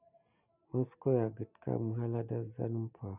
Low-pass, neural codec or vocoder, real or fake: 3.6 kHz; none; real